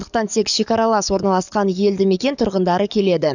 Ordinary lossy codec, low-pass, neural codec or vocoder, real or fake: none; 7.2 kHz; codec, 44.1 kHz, 7.8 kbps, DAC; fake